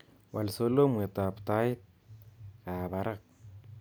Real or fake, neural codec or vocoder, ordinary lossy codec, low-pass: real; none; none; none